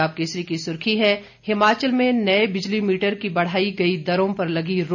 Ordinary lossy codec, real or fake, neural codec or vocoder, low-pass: none; real; none; 7.2 kHz